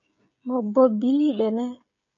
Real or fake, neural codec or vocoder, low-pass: fake; codec, 16 kHz, 16 kbps, FreqCodec, smaller model; 7.2 kHz